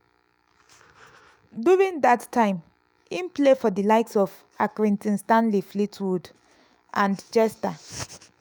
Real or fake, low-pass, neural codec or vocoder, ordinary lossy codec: fake; none; autoencoder, 48 kHz, 128 numbers a frame, DAC-VAE, trained on Japanese speech; none